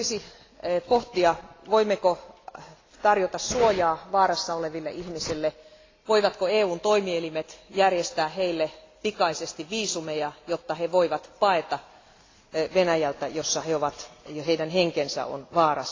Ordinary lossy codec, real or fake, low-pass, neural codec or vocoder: AAC, 32 kbps; real; 7.2 kHz; none